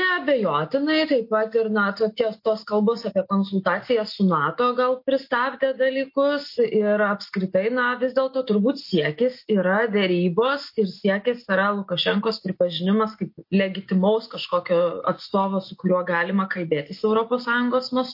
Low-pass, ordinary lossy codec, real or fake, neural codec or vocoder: 5.4 kHz; MP3, 32 kbps; real; none